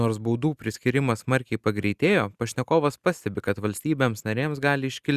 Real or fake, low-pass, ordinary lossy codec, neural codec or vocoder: real; 14.4 kHz; Opus, 64 kbps; none